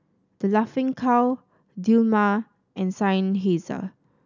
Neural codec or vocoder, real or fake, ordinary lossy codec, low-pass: none; real; none; 7.2 kHz